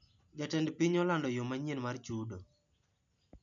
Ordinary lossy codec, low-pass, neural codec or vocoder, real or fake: none; 7.2 kHz; none; real